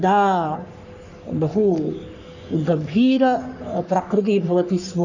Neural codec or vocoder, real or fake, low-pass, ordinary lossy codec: codec, 44.1 kHz, 3.4 kbps, Pupu-Codec; fake; 7.2 kHz; none